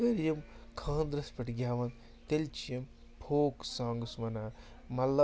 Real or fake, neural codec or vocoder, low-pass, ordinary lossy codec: real; none; none; none